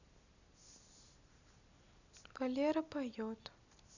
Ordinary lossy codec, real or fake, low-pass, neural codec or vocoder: none; real; 7.2 kHz; none